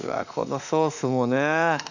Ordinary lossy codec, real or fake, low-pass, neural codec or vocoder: none; fake; 7.2 kHz; autoencoder, 48 kHz, 32 numbers a frame, DAC-VAE, trained on Japanese speech